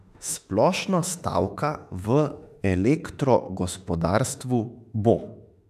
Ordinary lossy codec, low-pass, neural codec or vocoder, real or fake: none; 14.4 kHz; autoencoder, 48 kHz, 32 numbers a frame, DAC-VAE, trained on Japanese speech; fake